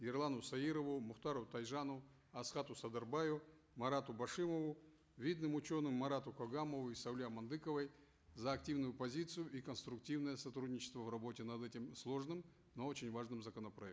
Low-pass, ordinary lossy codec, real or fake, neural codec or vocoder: none; none; real; none